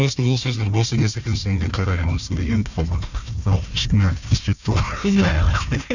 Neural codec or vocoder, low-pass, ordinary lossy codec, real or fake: codec, 16 kHz, 1 kbps, FunCodec, trained on Chinese and English, 50 frames a second; 7.2 kHz; none; fake